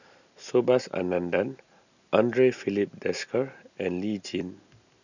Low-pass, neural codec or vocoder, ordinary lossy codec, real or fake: 7.2 kHz; none; none; real